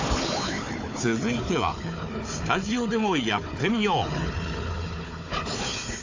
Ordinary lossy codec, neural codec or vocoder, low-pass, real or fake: AAC, 48 kbps; codec, 16 kHz, 4 kbps, FunCodec, trained on Chinese and English, 50 frames a second; 7.2 kHz; fake